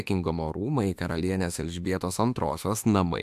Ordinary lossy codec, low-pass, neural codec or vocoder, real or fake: AAC, 96 kbps; 14.4 kHz; autoencoder, 48 kHz, 32 numbers a frame, DAC-VAE, trained on Japanese speech; fake